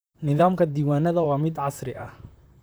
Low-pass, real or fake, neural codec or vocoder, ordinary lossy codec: none; fake; vocoder, 44.1 kHz, 128 mel bands, Pupu-Vocoder; none